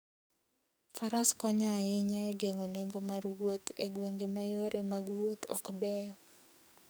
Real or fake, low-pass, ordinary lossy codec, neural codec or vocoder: fake; none; none; codec, 44.1 kHz, 2.6 kbps, SNAC